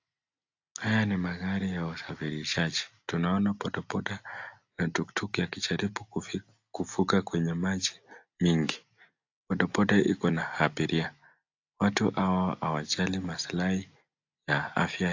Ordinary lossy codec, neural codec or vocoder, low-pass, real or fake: AAC, 48 kbps; none; 7.2 kHz; real